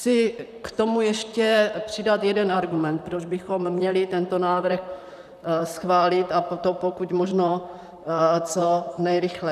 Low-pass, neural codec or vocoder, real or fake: 14.4 kHz; vocoder, 44.1 kHz, 128 mel bands, Pupu-Vocoder; fake